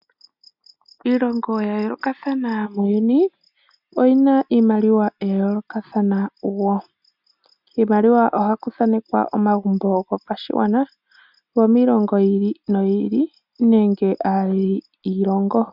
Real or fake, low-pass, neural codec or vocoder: real; 5.4 kHz; none